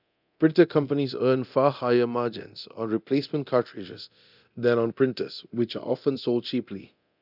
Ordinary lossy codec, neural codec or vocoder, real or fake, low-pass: none; codec, 24 kHz, 0.9 kbps, DualCodec; fake; 5.4 kHz